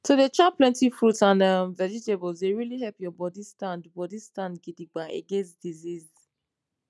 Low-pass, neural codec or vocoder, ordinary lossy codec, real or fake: none; none; none; real